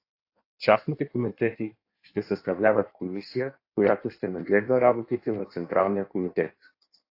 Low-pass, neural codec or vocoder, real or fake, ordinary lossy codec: 5.4 kHz; codec, 16 kHz in and 24 kHz out, 1.1 kbps, FireRedTTS-2 codec; fake; AAC, 32 kbps